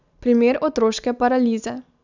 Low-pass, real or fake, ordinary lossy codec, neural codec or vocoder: 7.2 kHz; real; none; none